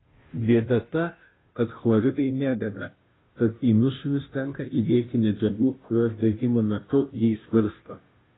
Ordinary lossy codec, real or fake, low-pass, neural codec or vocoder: AAC, 16 kbps; fake; 7.2 kHz; codec, 16 kHz, 0.5 kbps, FunCodec, trained on Chinese and English, 25 frames a second